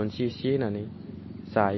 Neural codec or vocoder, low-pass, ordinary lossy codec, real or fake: none; 7.2 kHz; MP3, 24 kbps; real